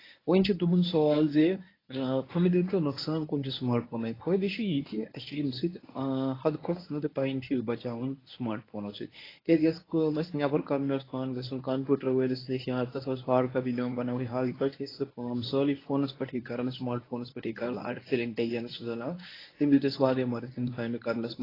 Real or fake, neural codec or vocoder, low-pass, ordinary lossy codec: fake; codec, 24 kHz, 0.9 kbps, WavTokenizer, medium speech release version 1; 5.4 kHz; AAC, 24 kbps